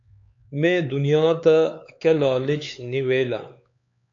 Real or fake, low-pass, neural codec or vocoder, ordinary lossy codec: fake; 7.2 kHz; codec, 16 kHz, 4 kbps, X-Codec, HuBERT features, trained on LibriSpeech; AAC, 64 kbps